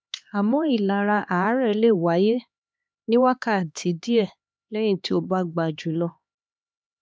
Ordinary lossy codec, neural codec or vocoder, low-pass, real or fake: none; codec, 16 kHz, 2 kbps, X-Codec, HuBERT features, trained on LibriSpeech; none; fake